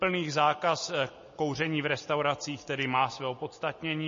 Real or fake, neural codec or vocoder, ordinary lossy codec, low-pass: real; none; MP3, 32 kbps; 7.2 kHz